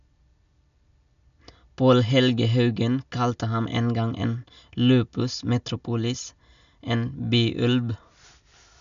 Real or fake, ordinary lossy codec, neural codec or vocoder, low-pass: real; none; none; 7.2 kHz